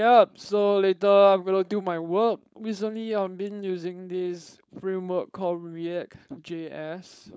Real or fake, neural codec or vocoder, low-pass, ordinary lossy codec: fake; codec, 16 kHz, 4.8 kbps, FACodec; none; none